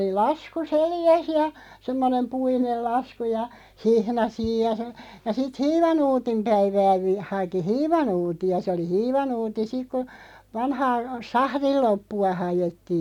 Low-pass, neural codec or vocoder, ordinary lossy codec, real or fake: 19.8 kHz; none; none; real